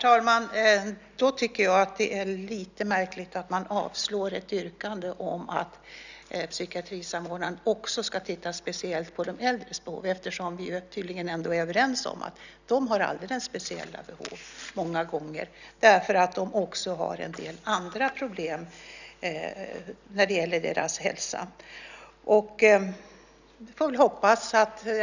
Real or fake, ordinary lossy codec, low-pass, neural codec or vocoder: real; none; 7.2 kHz; none